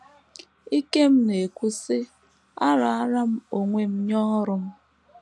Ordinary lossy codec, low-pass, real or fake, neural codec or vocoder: none; none; real; none